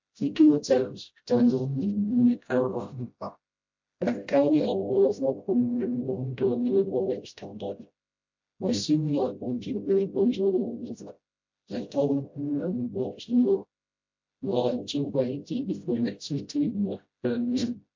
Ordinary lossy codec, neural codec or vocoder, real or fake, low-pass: MP3, 48 kbps; codec, 16 kHz, 0.5 kbps, FreqCodec, smaller model; fake; 7.2 kHz